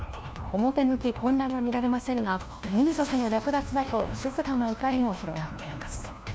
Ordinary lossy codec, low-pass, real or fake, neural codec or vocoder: none; none; fake; codec, 16 kHz, 1 kbps, FunCodec, trained on LibriTTS, 50 frames a second